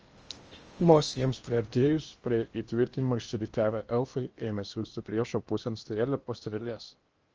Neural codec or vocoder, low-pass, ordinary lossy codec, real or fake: codec, 16 kHz in and 24 kHz out, 0.8 kbps, FocalCodec, streaming, 65536 codes; 7.2 kHz; Opus, 24 kbps; fake